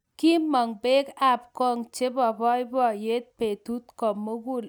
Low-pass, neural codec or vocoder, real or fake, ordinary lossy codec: none; none; real; none